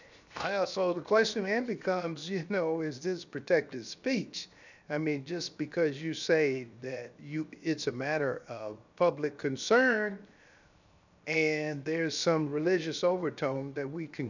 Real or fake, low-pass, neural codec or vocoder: fake; 7.2 kHz; codec, 16 kHz, 0.7 kbps, FocalCodec